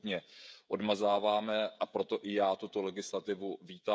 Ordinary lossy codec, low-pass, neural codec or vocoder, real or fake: none; none; codec, 16 kHz, 16 kbps, FreqCodec, smaller model; fake